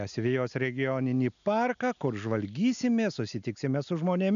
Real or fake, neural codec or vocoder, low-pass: real; none; 7.2 kHz